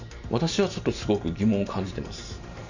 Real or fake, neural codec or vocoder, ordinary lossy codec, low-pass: fake; vocoder, 44.1 kHz, 128 mel bands every 512 samples, BigVGAN v2; none; 7.2 kHz